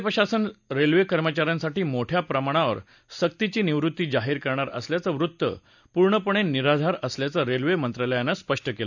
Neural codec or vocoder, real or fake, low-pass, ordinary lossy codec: none; real; 7.2 kHz; none